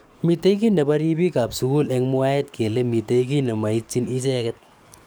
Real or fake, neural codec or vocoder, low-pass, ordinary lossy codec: fake; codec, 44.1 kHz, 7.8 kbps, DAC; none; none